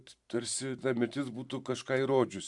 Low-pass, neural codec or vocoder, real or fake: 10.8 kHz; vocoder, 44.1 kHz, 128 mel bands every 512 samples, BigVGAN v2; fake